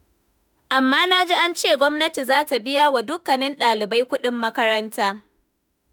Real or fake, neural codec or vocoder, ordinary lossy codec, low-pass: fake; autoencoder, 48 kHz, 32 numbers a frame, DAC-VAE, trained on Japanese speech; none; none